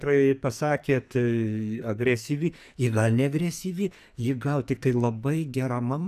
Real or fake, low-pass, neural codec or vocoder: fake; 14.4 kHz; codec, 44.1 kHz, 2.6 kbps, SNAC